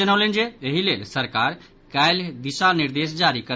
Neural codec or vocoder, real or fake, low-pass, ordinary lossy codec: none; real; none; none